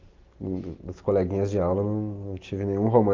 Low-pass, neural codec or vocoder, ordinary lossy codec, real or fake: 7.2 kHz; none; Opus, 32 kbps; real